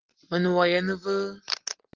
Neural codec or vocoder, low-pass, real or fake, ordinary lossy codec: none; 7.2 kHz; real; Opus, 16 kbps